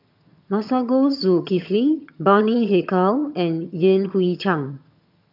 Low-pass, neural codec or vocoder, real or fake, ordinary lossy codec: 5.4 kHz; vocoder, 22.05 kHz, 80 mel bands, HiFi-GAN; fake; none